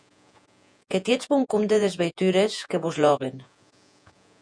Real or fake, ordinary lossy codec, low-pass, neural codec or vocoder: fake; MP3, 96 kbps; 9.9 kHz; vocoder, 48 kHz, 128 mel bands, Vocos